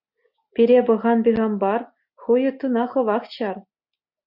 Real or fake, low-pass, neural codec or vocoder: real; 5.4 kHz; none